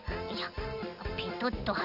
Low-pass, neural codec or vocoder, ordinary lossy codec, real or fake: 5.4 kHz; none; none; real